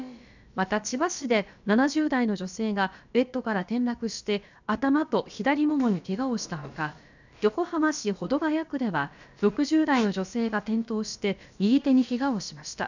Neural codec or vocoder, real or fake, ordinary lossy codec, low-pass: codec, 16 kHz, about 1 kbps, DyCAST, with the encoder's durations; fake; none; 7.2 kHz